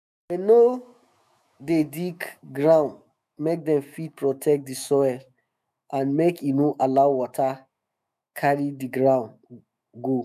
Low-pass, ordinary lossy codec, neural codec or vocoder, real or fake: 14.4 kHz; none; autoencoder, 48 kHz, 128 numbers a frame, DAC-VAE, trained on Japanese speech; fake